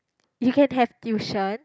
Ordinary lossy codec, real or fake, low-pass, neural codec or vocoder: none; real; none; none